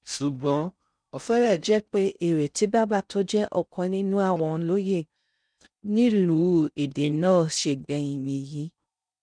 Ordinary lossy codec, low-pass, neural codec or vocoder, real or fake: none; 9.9 kHz; codec, 16 kHz in and 24 kHz out, 0.6 kbps, FocalCodec, streaming, 4096 codes; fake